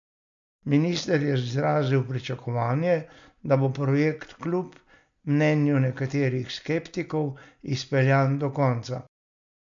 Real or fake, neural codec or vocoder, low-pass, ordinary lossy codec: real; none; 7.2 kHz; MP3, 64 kbps